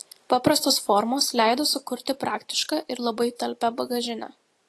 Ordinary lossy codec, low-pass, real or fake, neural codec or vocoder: AAC, 48 kbps; 14.4 kHz; real; none